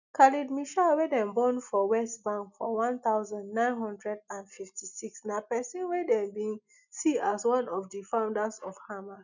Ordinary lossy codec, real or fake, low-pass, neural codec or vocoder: none; real; 7.2 kHz; none